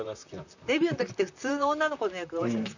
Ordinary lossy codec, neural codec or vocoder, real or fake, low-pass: none; vocoder, 44.1 kHz, 128 mel bands, Pupu-Vocoder; fake; 7.2 kHz